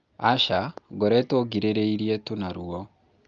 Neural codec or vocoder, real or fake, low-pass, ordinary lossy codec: none; real; 7.2 kHz; Opus, 24 kbps